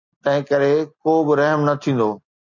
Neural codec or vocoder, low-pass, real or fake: none; 7.2 kHz; real